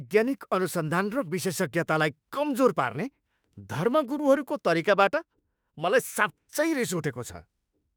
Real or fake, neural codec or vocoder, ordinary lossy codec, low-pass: fake; autoencoder, 48 kHz, 32 numbers a frame, DAC-VAE, trained on Japanese speech; none; none